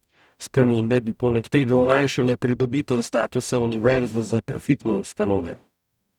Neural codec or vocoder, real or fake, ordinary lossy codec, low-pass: codec, 44.1 kHz, 0.9 kbps, DAC; fake; none; 19.8 kHz